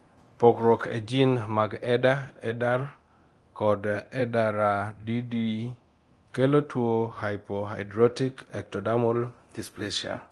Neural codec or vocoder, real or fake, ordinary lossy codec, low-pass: codec, 24 kHz, 0.9 kbps, DualCodec; fake; Opus, 24 kbps; 10.8 kHz